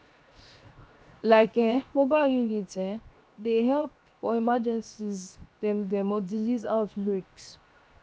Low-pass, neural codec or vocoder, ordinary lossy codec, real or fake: none; codec, 16 kHz, 0.7 kbps, FocalCodec; none; fake